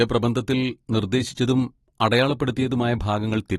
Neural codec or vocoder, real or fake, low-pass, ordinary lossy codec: none; real; 19.8 kHz; AAC, 32 kbps